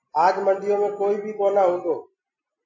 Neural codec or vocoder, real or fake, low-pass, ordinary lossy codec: none; real; 7.2 kHz; MP3, 48 kbps